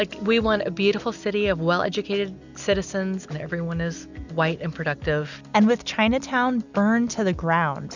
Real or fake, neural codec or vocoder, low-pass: real; none; 7.2 kHz